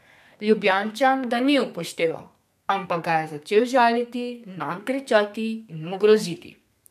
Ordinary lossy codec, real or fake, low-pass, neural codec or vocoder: none; fake; 14.4 kHz; codec, 32 kHz, 1.9 kbps, SNAC